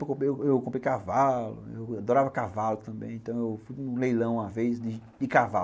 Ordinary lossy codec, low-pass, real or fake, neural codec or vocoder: none; none; real; none